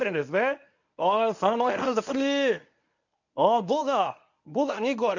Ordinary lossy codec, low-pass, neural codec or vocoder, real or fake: none; 7.2 kHz; codec, 24 kHz, 0.9 kbps, WavTokenizer, medium speech release version 1; fake